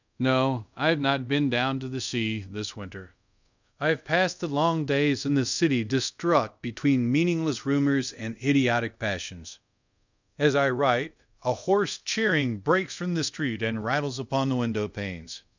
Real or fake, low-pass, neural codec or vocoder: fake; 7.2 kHz; codec, 24 kHz, 0.5 kbps, DualCodec